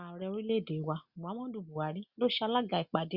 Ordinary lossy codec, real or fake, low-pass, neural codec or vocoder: Opus, 64 kbps; real; 5.4 kHz; none